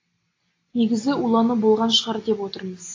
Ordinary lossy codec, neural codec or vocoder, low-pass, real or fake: AAC, 32 kbps; none; 7.2 kHz; real